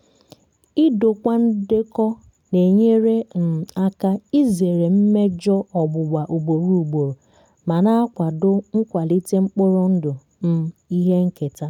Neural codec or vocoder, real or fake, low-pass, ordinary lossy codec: none; real; 19.8 kHz; none